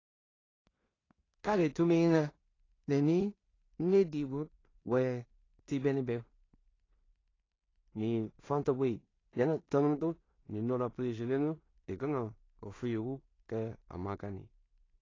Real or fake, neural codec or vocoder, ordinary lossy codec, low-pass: fake; codec, 16 kHz in and 24 kHz out, 0.4 kbps, LongCat-Audio-Codec, two codebook decoder; AAC, 32 kbps; 7.2 kHz